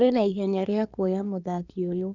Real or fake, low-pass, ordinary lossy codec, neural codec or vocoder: fake; 7.2 kHz; none; codec, 24 kHz, 1 kbps, SNAC